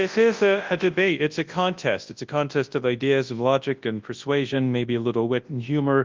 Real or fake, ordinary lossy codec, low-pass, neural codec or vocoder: fake; Opus, 24 kbps; 7.2 kHz; codec, 24 kHz, 0.9 kbps, WavTokenizer, large speech release